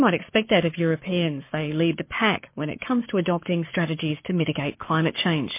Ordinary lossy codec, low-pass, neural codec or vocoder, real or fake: MP3, 24 kbps; 3.6 kHz; codec, 44.1 kHz, 7.8 kbps, Pupu-Codec; fake